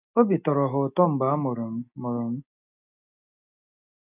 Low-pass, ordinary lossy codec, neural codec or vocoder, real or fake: 3.6 kHz; none; none; real